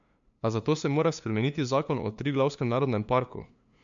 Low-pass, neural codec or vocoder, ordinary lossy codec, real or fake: 7.2 kHz; codec, 16 kHz, 2 kbps, FunCodec, trained on LibriTTS, 25 frames a second; MP3, 64 kbps; fake